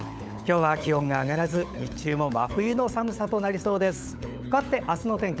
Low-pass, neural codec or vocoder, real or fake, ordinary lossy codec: none; codec, 16 kHz, 16 kbps, FunCodec, trained on LibriTTS, 50 frames a second; fake; none